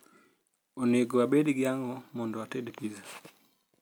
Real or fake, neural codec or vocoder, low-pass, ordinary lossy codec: real; none; none; none